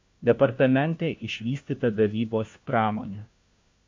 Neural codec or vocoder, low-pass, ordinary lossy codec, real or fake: codec, 16 kHz, 1 kbps, FunCodec, trained on LibriTTS, 50 frames a second; 7.2 kHz; MP3, 48 kbps; fake